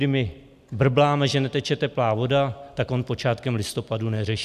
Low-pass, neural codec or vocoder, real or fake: 14.4 kHz; none; real